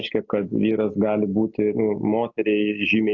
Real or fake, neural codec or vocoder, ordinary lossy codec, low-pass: real; none; AAC, 48 kbps; 7.2 kHz